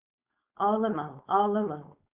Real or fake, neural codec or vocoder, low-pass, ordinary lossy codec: fake; codec, 16 kHz, 4.8 kbps, FACodec; 3.6 kHz; Opus, 64 kbps